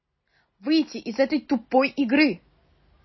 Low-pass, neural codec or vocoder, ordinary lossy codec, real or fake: 7.2 kHz; none; MP3, 24 kbps; real